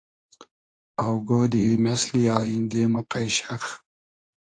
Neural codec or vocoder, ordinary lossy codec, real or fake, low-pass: codec, 24 kHz, 0.9 kbps, WavTokenizer, medium speech release version 2; AAC, 48 kbps; fake; 9.9 kHz